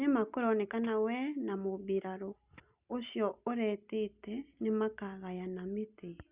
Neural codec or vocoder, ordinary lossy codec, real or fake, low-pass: none; Opus, 64 kbps; real; 3.6 kHz